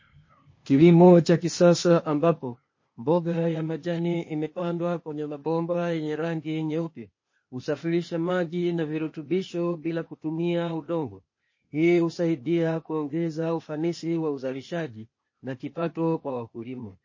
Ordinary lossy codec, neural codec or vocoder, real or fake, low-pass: MP3, 32 kbps; codec, 16 kHz, 0.8 kbps, ZipCodec; fake; 7.2 kHz